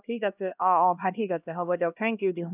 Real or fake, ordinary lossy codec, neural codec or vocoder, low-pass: fake; none; codec, 16 kHz, 1 kbps, X-Codec, HuBERT features, trained on LibriSpeech; 3.6 kHz